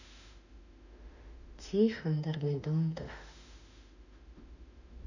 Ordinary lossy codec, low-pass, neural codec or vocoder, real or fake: none; 7.2 kHz; autoencoder, 48 kHz, 32 numbers a frame, DAC-VAE, trained on Japanese speech; fake